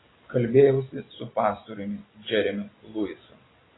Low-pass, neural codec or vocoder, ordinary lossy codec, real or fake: 7.2 kHz; none; AAC, 16 kbps; real